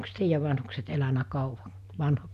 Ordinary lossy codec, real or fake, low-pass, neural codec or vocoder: none; real; 14.4 kHz; none